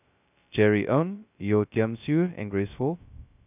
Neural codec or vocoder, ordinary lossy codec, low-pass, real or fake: codec, 16 kHz, 0.2 kbps, FocalCodec; none; 3.6 kHz; fake